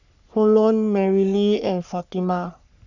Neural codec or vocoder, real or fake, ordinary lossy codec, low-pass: codec, 44.1 kHz, 3.4 kbps, Pupu-Codec; fake; none; 7.2 kHz